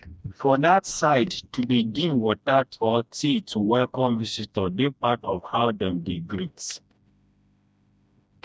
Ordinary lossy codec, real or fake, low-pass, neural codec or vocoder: none; fake; none; codec, 16 kHz, 1 kbps, FreqCodec, smaller model